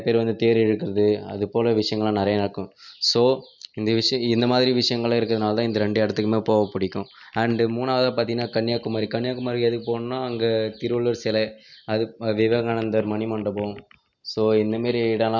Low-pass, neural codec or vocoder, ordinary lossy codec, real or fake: 7.2 kHz; none; none; real